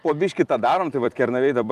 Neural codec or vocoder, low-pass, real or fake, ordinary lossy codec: vocoder, 44.1 kHz, 128 mel bands every 256 samples, BigVGAN v2; 14.4 kHz; fake; Opus, 32 kbps